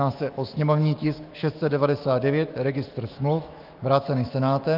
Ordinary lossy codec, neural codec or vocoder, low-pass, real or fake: Opus, 24 kbps; autoencoder, 48 kHz, 128 numbers a frame, DAC-VAE, trained on Japanese speech; 5.4 kHz; fake